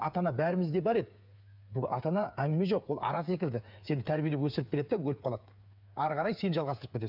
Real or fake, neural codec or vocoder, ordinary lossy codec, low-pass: fake; codec, 16 kHz, 8 kbps, FreqCodec, smaller model; none; 5.4 kHz